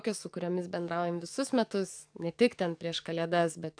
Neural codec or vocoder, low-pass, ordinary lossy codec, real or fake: codec, 44.1 kHz, 7.8 kbps, DAC; 9.9 kHz; AAC, 64 kbps; fake